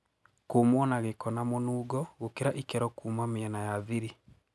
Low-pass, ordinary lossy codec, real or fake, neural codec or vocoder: none; none; real; none